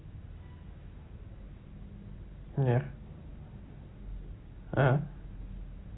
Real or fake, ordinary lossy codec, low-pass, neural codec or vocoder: real; AAC, 16 kbps; 7.2 kHz; none